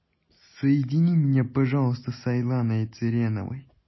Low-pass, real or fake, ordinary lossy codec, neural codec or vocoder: 7.2 kHz; real; MP3, 24 kbps; none